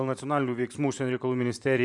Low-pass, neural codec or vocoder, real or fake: 10.8 kHz; vocoder, 44.1 kHz, 128 mel bands every 512 samples, BigVGAN v2; fake